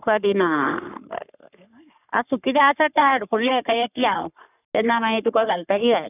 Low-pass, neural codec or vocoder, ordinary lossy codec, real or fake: 3.6 kHz; codec, 44.1 kHz, 3.4 kbps, Pupu-Codec; none; fake